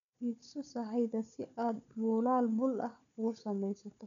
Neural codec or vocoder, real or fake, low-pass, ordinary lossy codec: codec, 16 kHz, 4 kbps, FunCodec, trained on Chinese and English, 50 frames a second; fake; 7.2 kHz; none